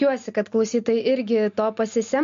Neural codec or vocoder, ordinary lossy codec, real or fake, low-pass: none; MP3, 48 kbps; real; 7.2 kHz